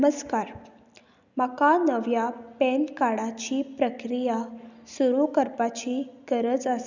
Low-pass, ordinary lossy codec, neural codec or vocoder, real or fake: 7.2 kHz; none; none; real